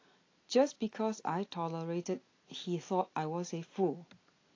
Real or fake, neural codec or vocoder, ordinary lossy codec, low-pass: real; none; AAC, 32 kbps; 7.2 kHz